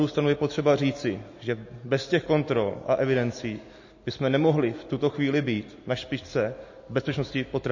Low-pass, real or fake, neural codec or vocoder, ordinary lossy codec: 7.2 kHz; real; none; MP3, 32 kbps